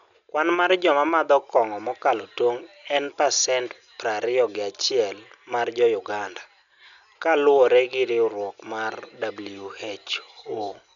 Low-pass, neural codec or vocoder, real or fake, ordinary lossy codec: 7.2 kHz; none; real; none